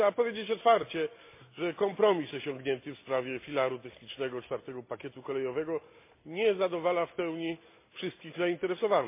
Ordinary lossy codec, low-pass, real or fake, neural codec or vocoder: MP3, 24 kbps; 3.6 kHz; real; none